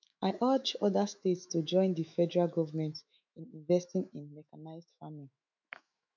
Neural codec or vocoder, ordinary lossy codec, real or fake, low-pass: autoencoder, 48 kHz, 128 numbers a frame, DAC-VAE, trained on Japanese speech; none; fake; 7.2 kHz